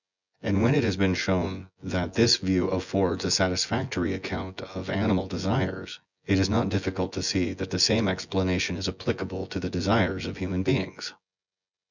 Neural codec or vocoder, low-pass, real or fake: vocoder, 24 kHz, 100 mel bands, Vocos; 7.2 kHz; fake